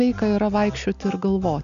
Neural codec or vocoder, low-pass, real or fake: none; 7.2 kHz; real